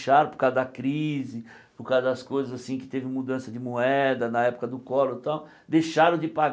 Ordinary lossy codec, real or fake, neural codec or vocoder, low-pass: none; real; none; none